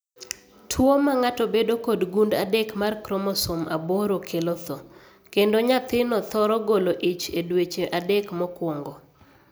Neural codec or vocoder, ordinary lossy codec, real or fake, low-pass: none; none; real; none